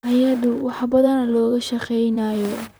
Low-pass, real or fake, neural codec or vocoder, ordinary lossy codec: none; fake; vocoder, 44.1 kHz, 128 mel bands, Pupu-Vocoder; none